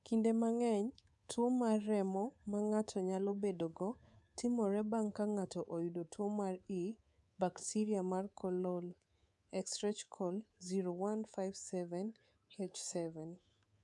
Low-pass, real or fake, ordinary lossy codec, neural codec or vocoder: 10.8 kHz; fake; none; codec, 24 kHz, 3.1 kbps, DualCodec